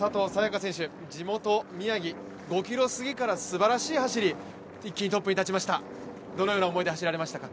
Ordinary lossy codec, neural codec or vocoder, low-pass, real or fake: none; none; none; real